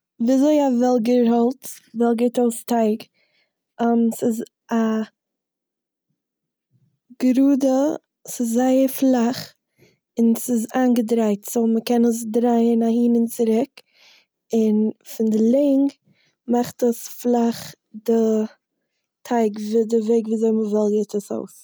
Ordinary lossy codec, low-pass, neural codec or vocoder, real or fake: none; none; none; real